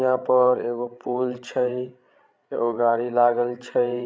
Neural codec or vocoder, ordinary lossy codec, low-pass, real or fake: codec, 16 kHz, 16 kbps, FreqCodec, larger model; none; none; fake